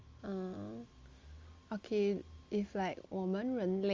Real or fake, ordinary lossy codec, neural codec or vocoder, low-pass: real; Opus, 32 kbps; none; 7.2 kHz